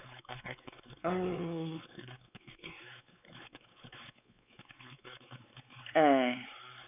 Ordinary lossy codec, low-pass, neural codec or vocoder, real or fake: none; 3.6 kHz; codec, 16 kHz, 4 kbps, X-Codec, WavLM features, trained on Multilingual LibriSpeech; fake